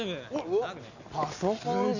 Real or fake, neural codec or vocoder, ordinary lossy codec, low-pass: real; none; none; 7.2 kHz